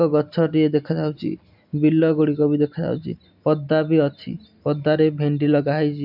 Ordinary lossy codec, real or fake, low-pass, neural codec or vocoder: none; real; 5.4 kHz; none